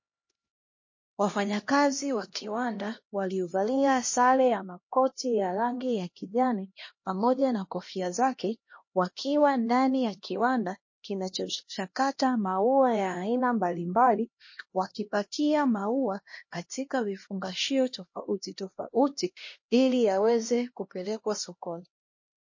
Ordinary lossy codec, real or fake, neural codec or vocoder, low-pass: MP3, 32 kbps; fake; codec, 16 kHz, 1 kbps, X-Codec, HuBERT features, trained on LibriSpeech; 7.2 kHz